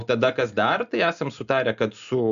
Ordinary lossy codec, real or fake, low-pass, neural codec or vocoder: MP3, 96 kbps; real; 7.2 kHz; none